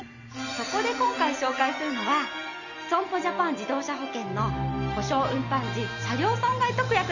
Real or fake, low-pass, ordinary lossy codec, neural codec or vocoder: real; 7.2 kHz; none; none